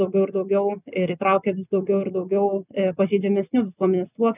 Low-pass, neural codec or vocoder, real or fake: 3.6 kHz; none; real